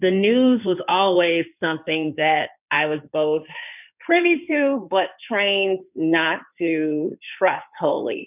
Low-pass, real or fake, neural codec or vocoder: 3.6 kHz; fake; codec, 16 kHz in and 24 kHz out, 2.2 kbps, FireRedTTS-2 codec